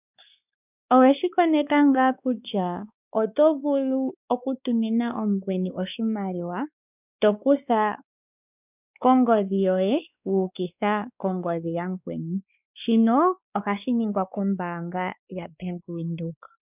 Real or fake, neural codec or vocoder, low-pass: fake; codec, 16 kHz, 2 kbps, X-Codec, WavLM features, trained on Multilingual LibriSpeech; 3.6 kHz